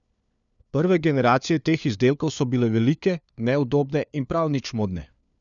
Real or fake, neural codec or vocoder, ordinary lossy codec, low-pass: fake; codec, 16 kHz, 4 kbps, FunCodec, trained on LibriTTS, 50 frames a second; none; 7.2 kHz